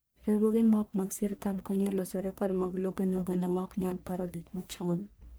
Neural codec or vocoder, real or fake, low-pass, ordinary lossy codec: codec, 44.1 kHz, 1.7 kbps, Pupu-Codec; fake; none; none